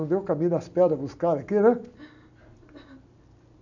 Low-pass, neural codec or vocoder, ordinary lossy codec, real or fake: 7.2 kHz; none; none; real